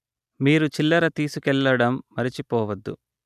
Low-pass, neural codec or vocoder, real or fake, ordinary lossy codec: 14.4 kHz; none; real; none